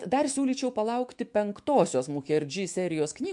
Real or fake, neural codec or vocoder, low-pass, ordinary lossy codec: fake; autoencoder, 48 kHz, 128 numbers a frame, DAC-VAE, trained on Japanese speech; 10.8 kHz; MP3, 64 kbps